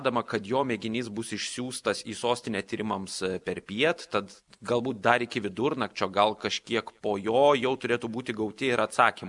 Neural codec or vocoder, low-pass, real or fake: none; 10.8 kHz; real